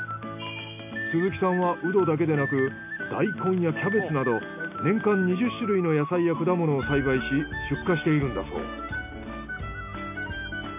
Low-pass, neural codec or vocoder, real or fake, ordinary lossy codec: 3.6 kHz; none; real; none